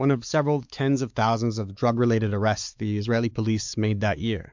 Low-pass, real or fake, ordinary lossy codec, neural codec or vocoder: 7.2 kHz; fake; MP3, 64 kbps; codec, 16 kHz, 4 kbps, X-Codec, WavLM features, trained on Multilingual LibriSpeech